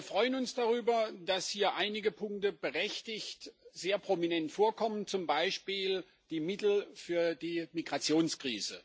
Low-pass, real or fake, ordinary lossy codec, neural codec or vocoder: none; real; none; none